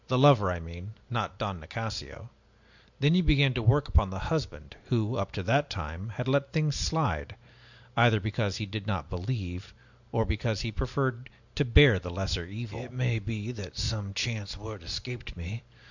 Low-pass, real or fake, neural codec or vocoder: 7.2 kHz; real; none